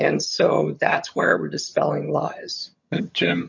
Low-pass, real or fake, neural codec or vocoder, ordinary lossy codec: 7.2 kHz; fake; vocoder, 22.05 kHz, 80 mel bands, HiFi-GAN; MP3, 48 kbps